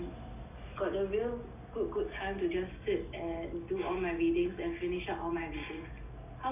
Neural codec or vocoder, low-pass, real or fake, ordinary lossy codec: none; 3.6 kHz; real; none